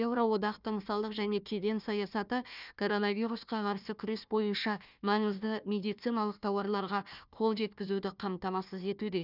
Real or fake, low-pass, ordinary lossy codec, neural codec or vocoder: fake; 5.4 kHz; none; codec, 16 kHz, 1 kbps, FunCodec, trained on Chinese and English, 50 frames a second